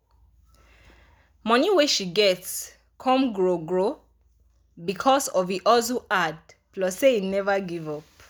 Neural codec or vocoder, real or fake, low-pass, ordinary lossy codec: none; real; none; none